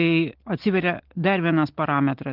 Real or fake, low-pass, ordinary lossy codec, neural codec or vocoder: real; 5.4 kHz; Opus, 16 kbps; none